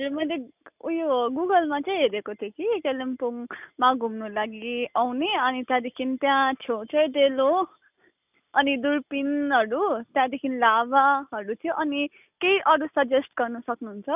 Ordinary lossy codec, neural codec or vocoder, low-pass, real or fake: none; none; 3.6 kHz; real